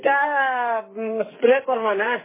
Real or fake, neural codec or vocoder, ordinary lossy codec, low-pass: fake; codec, 44.1 kHz, 2.6 kbps, SNAC; MP3, 16 kbps; 3.6 kHz